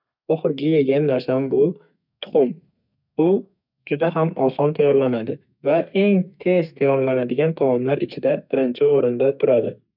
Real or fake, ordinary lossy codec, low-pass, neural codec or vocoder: fake; none; 5.4 kHz; codec, 32 kHz, 1.9 kbps, SNAC